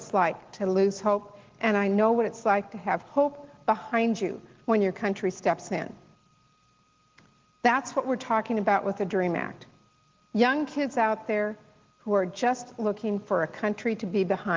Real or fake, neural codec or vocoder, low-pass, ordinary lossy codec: real; none; 7.2 kHz; Opus, 16 kbps